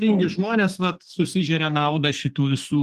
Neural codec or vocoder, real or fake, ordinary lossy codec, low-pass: codec, 44.1 kHz, 2.6 kbps, SNAC; fake; Opus, 24 kbps; 14.4 kHz